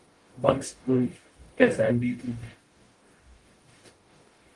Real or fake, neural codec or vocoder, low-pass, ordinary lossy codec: fake; codec, 44.1 kHz, 0.9 kbps, DAC; 10.8 kHz; Opus, 24 kbps